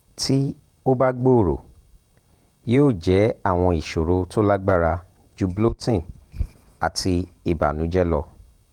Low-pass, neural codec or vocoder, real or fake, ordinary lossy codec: 19.8 kHz; vocoder, 48 kHz, 128 mel bands, Vocos; fake; Opus, 32 kbps